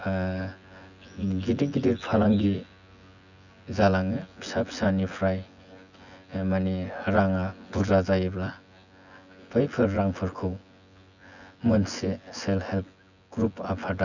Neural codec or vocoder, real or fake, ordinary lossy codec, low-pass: vocoder, 24 kHz, 100 mel bands, Vocos; fake; none; 7.2 kHz